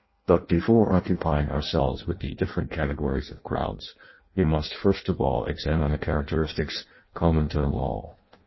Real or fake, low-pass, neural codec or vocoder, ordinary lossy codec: fake; 7.2 kHz; codec, 16 kHz in and 24 kHz out, 0.6 kbps, FireRedTTS-2 codec; MP3, 24 kbps